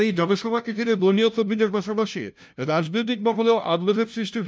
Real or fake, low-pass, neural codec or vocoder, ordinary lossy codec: fake; none; codec, 16 kHz, 0.5 kbps, FunCodec, trained on LibriTTS, 25 frames a second; none